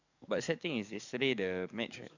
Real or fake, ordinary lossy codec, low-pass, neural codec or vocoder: fake; none; 7.2 kHz; codec, 44.1 kHz, 7.8 kbps, DAC